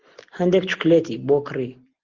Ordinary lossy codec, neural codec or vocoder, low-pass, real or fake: Opus, 16 kbps; none; 7.2 kHz; real